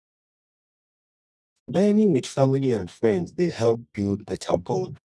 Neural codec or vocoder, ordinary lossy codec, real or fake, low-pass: codec, 24 kHz, 0.9 kbps, WavTokenizer, medium music audio release; none; fake; none